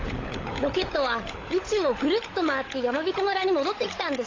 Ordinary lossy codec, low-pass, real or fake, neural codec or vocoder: AAC, 32 kbps; 7.2 kHz; fake; codec, 16 kHz, 16 kbps, FunCodec, trained on LibriTTS, 50 frames a second